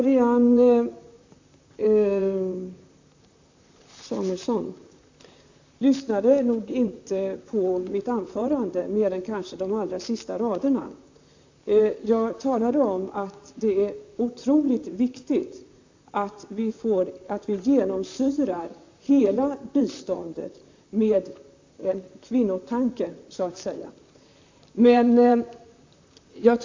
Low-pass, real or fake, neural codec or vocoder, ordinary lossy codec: 7.2 kHz; fake; vocoder, 44.1 kHz, 128 mel bands, Pupu-Vocoder; AAC, 48 kbps